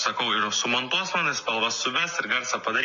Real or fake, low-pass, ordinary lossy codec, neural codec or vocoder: real; 7.2 kHz; MP3, 48 kbps; none